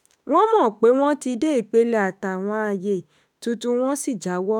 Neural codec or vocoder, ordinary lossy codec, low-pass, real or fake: autoencoder, 48 kHz, 32 numbers a frame, DAC-VAE, trained on Japanese speech; none; 19.8 kHz; fake